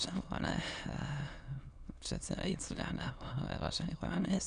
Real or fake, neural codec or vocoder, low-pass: fake; autoencoder, 22.05 kHz, a latent of 192 numbers a frame, VITS, trained on many speakers; 9.9 kHz